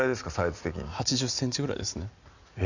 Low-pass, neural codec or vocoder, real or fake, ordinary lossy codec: 7.2 kHz; none; real; none